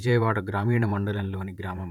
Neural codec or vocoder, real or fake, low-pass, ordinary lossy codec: vocoder, 44.1 kHz, 128 mel bands, Pupu-Vocoder; fake; 19.8 kHz; MP3, 96 kbps